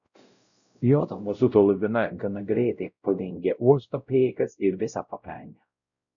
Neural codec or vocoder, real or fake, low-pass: codec, 16 kHz, 0.5 kbps, X-Codec, WavLM features, trained on Multilingual LibriSpeech; fake; 7.2 kHz